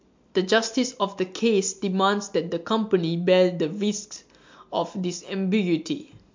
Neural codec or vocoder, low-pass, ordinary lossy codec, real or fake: none; 7.2 kHz; MP3, 48 kbps; real